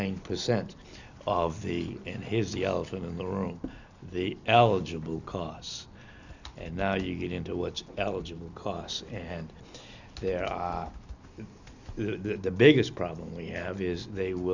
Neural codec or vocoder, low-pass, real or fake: none; 7.2 kHz; real